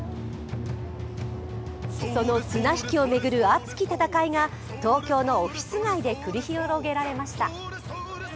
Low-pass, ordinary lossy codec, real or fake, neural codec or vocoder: none; none; real; none